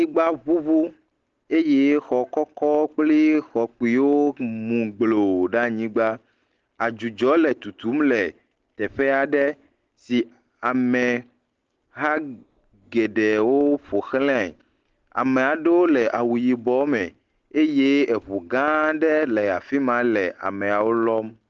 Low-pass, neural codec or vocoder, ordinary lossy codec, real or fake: 7.2 kHz; none; Opus, 16 kbps; real